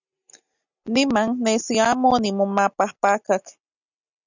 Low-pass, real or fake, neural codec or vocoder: 7.2 kHz; real; none